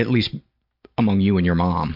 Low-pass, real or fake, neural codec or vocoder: 5.4 kHz; real; none